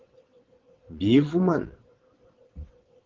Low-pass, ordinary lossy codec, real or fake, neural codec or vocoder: 7.2 kHz; Opus, 16 kbps; fake; vocoder, 22.05 kHz, 80 mel bands, WaveNeXt